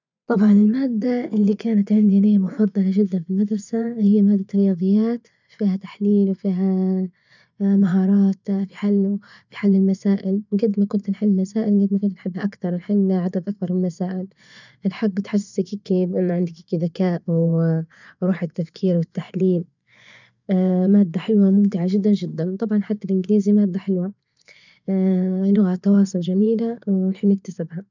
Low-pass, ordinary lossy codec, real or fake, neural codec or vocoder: 7.2 kHz; none; fake; vocoder, 24 kHz, 100 mel bands, Vocos